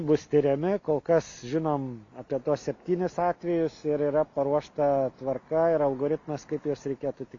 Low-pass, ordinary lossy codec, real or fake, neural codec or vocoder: 7.2 kHz; AAC, 48 kbps; real; none